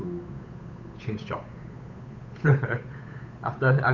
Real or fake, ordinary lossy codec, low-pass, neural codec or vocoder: fake; none; 7.2 kHz; codec, 16 kHz, 8 kbps, FunCodec, trained on Chinese and English, 25 frames a second